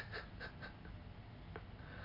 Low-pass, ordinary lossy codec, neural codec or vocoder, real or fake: 5.4 kHz; AAC, 32 kbps; none; real